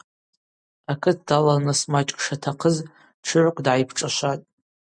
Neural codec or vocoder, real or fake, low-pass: none; real; 9.9 kHz